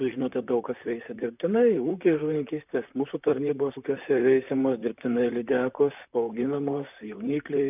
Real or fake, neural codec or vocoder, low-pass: fake; vocoder, 44.1 kHz, 128 mel bands, Pupu-Vocoder; 3.6 kHz